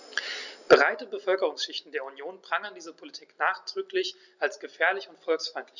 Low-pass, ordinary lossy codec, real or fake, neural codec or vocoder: 7.2 kHz; none; real; none